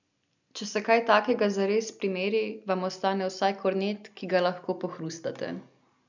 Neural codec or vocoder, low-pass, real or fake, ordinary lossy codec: none; 7.2 kHz; real; none